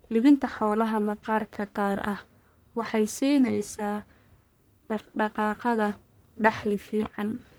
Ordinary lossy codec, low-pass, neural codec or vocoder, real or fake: none; none; codec, 44.1 kHz, 1.7 kbps, Pupu-Codec; fake